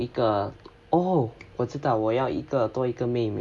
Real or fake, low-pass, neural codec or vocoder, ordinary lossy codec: real; none; none; none